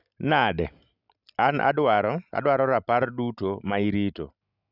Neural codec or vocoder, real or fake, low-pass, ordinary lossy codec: none; real; 5.4 kHz; none